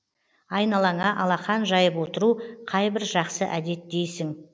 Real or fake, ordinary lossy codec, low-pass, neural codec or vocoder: real; none; none; none